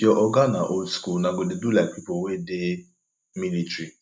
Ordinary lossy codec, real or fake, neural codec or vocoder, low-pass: none; real; none; none